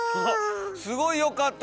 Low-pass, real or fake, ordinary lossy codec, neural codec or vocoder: none; real; none; none